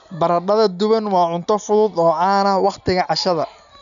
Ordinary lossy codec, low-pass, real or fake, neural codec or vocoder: none; 7.2 kHz; real; none